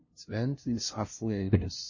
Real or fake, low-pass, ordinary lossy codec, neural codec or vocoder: fake; 7.2 kHz; MP3, 32 kbps; codec, 16 kHz, 0.5 kbps, FunCodec, trained on LibriTTS, 25 frames a second